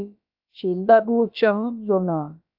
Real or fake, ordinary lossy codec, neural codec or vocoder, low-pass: fake; AAC, 48 kbps; codec, 16 kHz, about 1 kbps, DyCAST, with the encoder's durations; 5.4 kHz